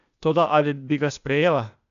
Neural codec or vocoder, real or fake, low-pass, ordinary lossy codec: codec, 16 kHz, 0.8 kbps, ZipCodec; fake; 7.2 kHz; none